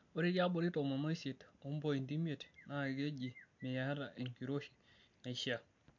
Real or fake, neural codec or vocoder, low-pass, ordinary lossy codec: real; none; 7.2 kHz; MP3, 48 kbps